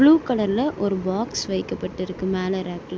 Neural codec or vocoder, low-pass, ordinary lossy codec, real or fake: none; none; none; real